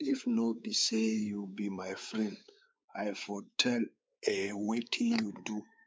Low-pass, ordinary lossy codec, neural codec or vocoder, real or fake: none; none; codec, 16 kHz, 4 kbps, X-Codec, WavLM features, trained on Multilingual LibriSpeech; fake